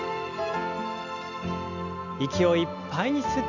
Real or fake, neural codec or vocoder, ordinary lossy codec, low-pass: real; none; none; 7.2 kHz